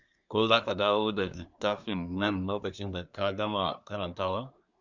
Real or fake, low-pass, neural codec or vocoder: fake; 7.2 kHz; codec, 24 kHz, 1 kbps, SNAC